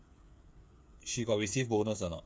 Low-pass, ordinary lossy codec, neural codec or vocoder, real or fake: none; none; codec, 16 kHz, 8 kbps, FreqCodec, smaller model; fake